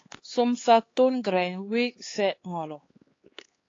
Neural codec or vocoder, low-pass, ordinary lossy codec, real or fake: codec, 16 kHz, 4 kbps, X-Codec, HuBERT features, trained on LibriSpeech; 7.2 kHz; AAC, 32 kbps; fake